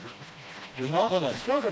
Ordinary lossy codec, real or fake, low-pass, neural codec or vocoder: none; fake; none; codec, 16 kHz, 1 kbps, FreqCodec, smaller model